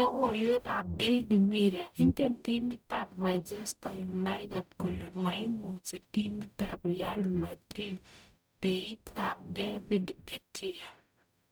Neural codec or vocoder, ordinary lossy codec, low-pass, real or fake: codec, 44.1 kHz, 0.9 kbps, DAC; none; none; fake